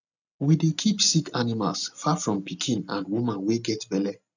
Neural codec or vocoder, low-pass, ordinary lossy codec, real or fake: none; 7.2 kHz; none; real